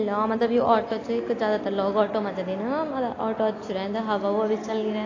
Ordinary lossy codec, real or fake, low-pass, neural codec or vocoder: AAC, 32 kbps; real; 7.2 kHz; none